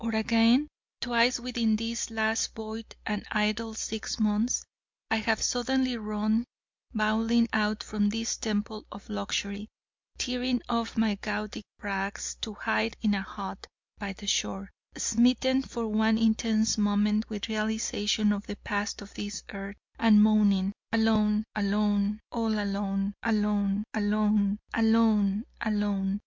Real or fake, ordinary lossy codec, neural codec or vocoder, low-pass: real; MP3, 64 kbps; none; 7.2 kHz